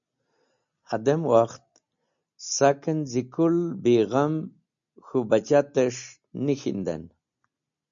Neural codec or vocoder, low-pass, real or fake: none; 7.2 kHz; real